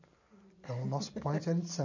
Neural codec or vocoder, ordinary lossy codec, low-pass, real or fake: none; none; 7.2 kHz; real